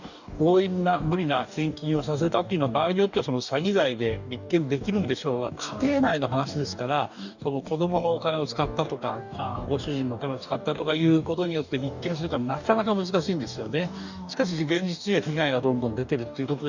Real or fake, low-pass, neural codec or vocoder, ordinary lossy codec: fake; 7.2 kHz; codec, 44.1 kHz, 2.6 kbps, DAC; none